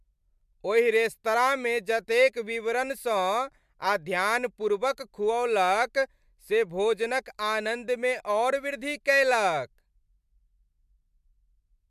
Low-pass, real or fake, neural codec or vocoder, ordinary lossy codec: 14.4 kHz; real; none; MP3, 96 kbps